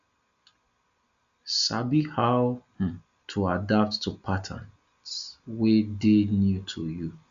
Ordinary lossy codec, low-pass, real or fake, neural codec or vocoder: Opus, 64 kbps; 7.2 kHz; real; none